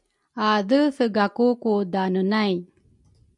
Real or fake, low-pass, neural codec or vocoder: real; 10.8 kHz; none